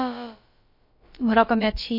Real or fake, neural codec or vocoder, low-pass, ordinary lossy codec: fake; codec, 16 kHz, about 1 kbps, DyCAST, with the encoder's durations; 5.4 kHz; MP3, 32 kbps